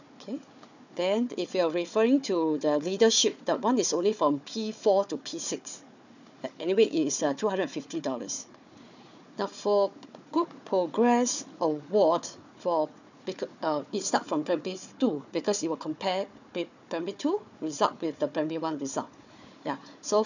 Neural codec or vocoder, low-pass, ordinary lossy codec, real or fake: codec, 16 kHz, 4 kbps, FunCodec, trained on Chinese and English, 50 frames a second; 7.2 kHz; none; fake